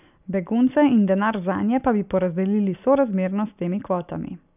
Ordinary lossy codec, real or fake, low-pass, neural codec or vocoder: none; real; 3.6 kHz; none